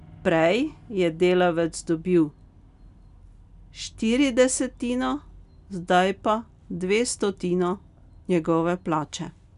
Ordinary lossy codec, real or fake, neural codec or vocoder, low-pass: none; real; none; 10.8 kHz